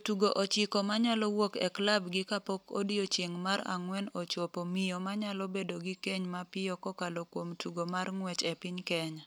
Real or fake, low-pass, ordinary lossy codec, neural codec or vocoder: real; none; none; none